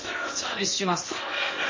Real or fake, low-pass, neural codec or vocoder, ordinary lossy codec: fake; 7.2 kHz; codec, 16 kHz in and 24 kHz out, 0.8 kbps, FocalCodec, streaming, 65536 codes; MP3, 32 kbps